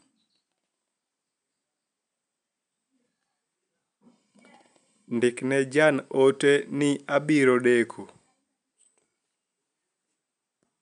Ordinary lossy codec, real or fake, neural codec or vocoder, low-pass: none; real; none; 9.9 kHz